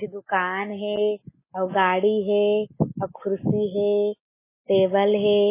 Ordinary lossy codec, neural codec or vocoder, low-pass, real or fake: MP3, 16 kbps; none; 3.6 kHz; real